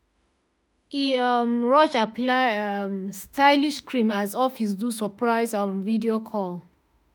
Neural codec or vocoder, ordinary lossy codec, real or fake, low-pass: autoencoder, 48 kHz, 32 numbers a frame, DAC-VAE, trained on Japanese speech; none; fake; none